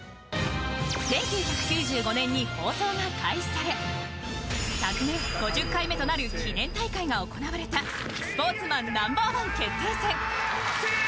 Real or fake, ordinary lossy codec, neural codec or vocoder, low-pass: real; none; none; none